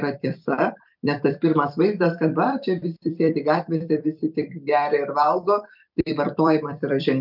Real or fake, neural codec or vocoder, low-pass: fake; vocoder, 44.1 kHz, 128 mel bands every 256 samples, BigVGAN v2; 5.4 kHz